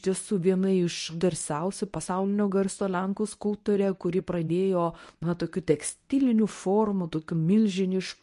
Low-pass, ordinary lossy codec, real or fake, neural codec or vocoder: 10.8 kHz; MP3, 48 kbps; fake; codec, 24 kHz, 0.9 kbps, WavTokenizer, medium speech release version 1